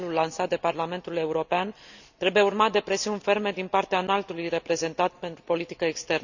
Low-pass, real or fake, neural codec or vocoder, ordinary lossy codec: 7.2 kHz; real; none; none